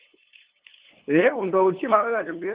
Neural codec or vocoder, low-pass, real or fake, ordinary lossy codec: codec, 16 kHz, 2 kbps, FunCodec, trained on LibriTTS, 25 frames a second; 3.6 kHz; fake; Opus, 16 kbps